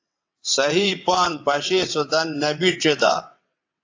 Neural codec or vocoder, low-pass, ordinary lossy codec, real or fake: vocoder, 22.05 kHz, 80 mel bands, WaveNeXt; 7.2 kHz; AAC, 48 kbps; fake